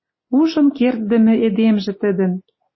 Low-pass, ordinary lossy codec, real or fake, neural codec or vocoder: 7.2 kHz; MP3, 24 kbps; real; none